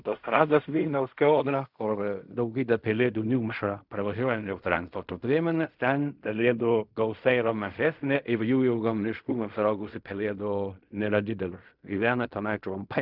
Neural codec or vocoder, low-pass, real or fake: codec, 16 kHz in and 24 kHz out, 0.4 kbps, LongCat-Audio-Codec, fine tuned four codebook decoder; 5.4 kHz; fake